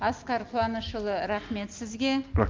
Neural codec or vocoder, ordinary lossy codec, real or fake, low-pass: none; Opus, 24 kbps; real; 7.2 kHz